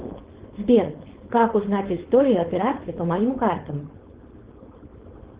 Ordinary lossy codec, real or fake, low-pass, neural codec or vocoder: Opus, 16 kbps; fake; 3.6 kHz; codec, 16 kHz, 4.8 kbps, FACodec